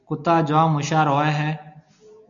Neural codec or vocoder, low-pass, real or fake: none; 7.2 kHz; real